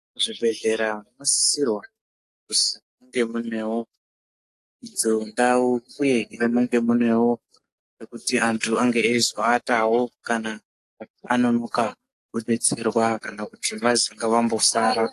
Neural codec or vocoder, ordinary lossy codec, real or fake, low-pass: codec, 44.1 kHz, 7.8 kbps, DAC; AAC, 48 kbps; fake; 14.4 kHz